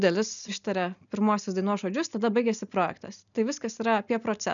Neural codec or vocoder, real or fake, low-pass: none; real; 7.2 kHz